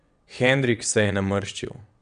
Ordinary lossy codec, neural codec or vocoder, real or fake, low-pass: none; vocoder, 22.05 kHz, 80 mel bands, WaveNeXt; fake; 9.9 kHz